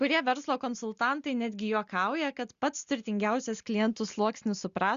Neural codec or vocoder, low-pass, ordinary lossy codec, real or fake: none; 7.2 kHz; Opus, 64 kbps; real